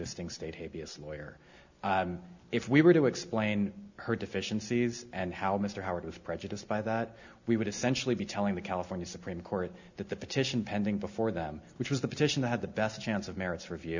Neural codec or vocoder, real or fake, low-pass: none; real; 7.2 kHz